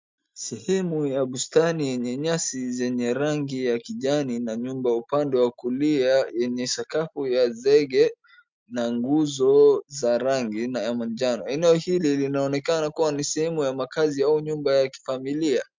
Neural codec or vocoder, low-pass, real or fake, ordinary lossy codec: none; 7.2 kHz; real; MP3, 64 kbps